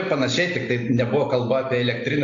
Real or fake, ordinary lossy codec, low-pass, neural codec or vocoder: real; AAC, 48 kbps; 7.2 kHz; none